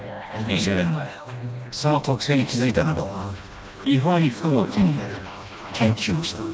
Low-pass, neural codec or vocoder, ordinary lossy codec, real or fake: none; codec, 16 kHz, 1 kbps, FreqCodec, smaller model; none; fake